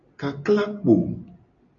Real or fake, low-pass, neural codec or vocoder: real; 7.2 kHz; none